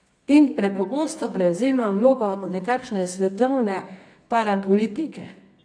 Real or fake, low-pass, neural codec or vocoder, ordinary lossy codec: fake; 9.9 kHz; codec, 24 kHz, 0.9 kbps, WavTokenizer, medium music audio release; AAC, 64 kbps